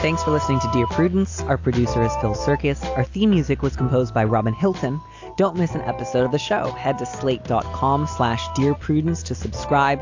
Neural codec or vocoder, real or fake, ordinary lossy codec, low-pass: none; real; AAC, 48 kbps; 7.2 kHz